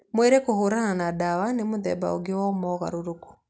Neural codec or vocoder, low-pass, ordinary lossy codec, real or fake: none; none; none; real